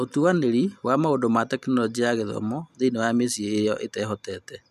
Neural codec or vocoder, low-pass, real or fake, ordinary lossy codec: none; 14.4 kHz; real; none